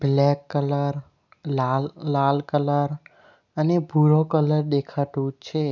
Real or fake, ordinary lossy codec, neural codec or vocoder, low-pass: real; none; none; 7.2 kHz